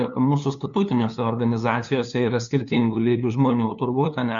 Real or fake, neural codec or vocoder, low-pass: fake; codec, 16 kHz, 2 kbps, FunCodec, trained on LibriTTS, 25 frames a second; 7.2 kHz